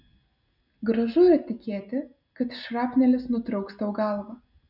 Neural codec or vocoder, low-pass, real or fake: none; 5.4 kHz; real